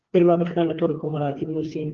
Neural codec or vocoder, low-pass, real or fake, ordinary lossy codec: codec, 16 kHz, 2 kbps, FreqCodec, larger model; 7.2 kHz; fake; Opus, 16 kbps